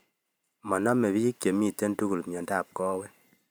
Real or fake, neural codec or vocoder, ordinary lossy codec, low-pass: fake; vocoder, 44.1 kHz, 128 mel bands every 512 samples, BigVGAN v2; none; none